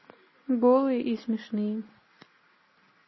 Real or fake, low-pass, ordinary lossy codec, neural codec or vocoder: real; 7.2 kHz; MP3, 24 kbps; none